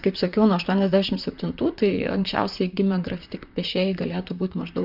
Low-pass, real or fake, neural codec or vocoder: 5.4 kHz; fake; vocoder, 44.1 kHz, 128 mel bands, Pupu-Vocoder